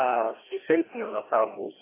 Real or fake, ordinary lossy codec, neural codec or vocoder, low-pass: fake; AAC, 16 kbps; codec, 16 kHz, 1 kbps, FreqCodec, larger model; 3.6 kHz